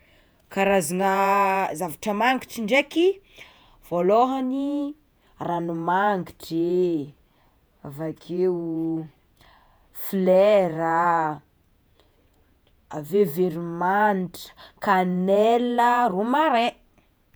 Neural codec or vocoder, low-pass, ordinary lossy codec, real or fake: vocoder, 48 kHz, 128 mel bands, Vocos; none; none; fake